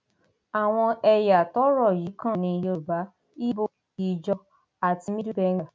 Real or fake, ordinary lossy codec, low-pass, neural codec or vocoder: real; none; none; none